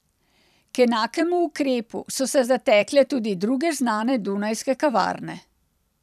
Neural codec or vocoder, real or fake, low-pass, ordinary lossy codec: vocoder, 44.1 kHz, 128 mel bands every 256 samples, BigVGAN v2; fake; 14.4 kHz; none